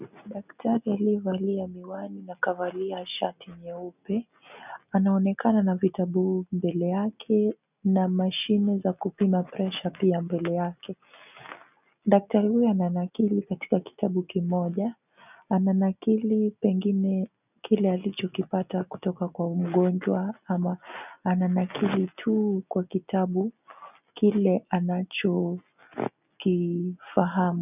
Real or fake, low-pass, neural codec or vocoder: real; 3.6 kHz; none